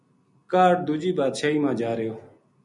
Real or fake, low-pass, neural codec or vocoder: real; 10.8 kHz; none